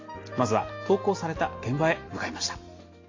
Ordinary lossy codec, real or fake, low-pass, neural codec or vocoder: AAC, 32 kbps; real; 7.2 kHz; none